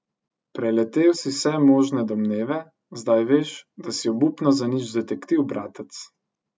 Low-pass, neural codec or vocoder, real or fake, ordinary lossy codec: none; none; real; none